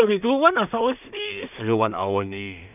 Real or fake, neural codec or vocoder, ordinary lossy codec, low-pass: fake; codec, 16 kHz in and 24 kHz out, 0.4 kbps, LongCat-Audio-Codec, two codebook decoder; none; 3.6 kHz